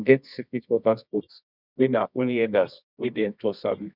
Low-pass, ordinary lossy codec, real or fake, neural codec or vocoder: 5.4 kHz; none; fake; codec, 24 kHz, 0.9 kbps, WavTokenizer, medium music audio release